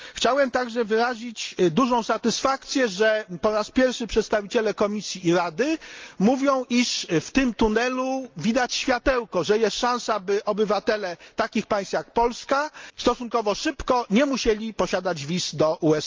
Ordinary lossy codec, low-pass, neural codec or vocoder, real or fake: Opus, 24 kbps; 7.2 kHz; none; real